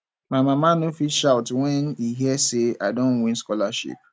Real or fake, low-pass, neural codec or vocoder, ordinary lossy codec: real; none; none; none